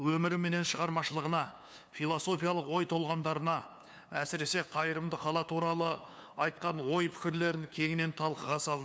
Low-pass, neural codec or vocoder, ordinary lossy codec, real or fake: none; codec, 16 kHz, 2 kbps, FunCodec, trained on LibriTTS, 25 frames a second; none; fake